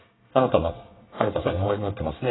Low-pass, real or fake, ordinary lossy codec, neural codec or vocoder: 7.2 kHz; fake; AAC, 16 kbps; codec, 24 kHz, 1 kbps, SNAC